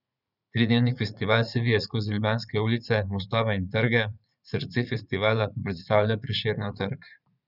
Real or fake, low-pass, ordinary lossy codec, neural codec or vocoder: fake; 5.4 kHz; none; vocoder, 44.1 kHz, 80 mel bands, Vocos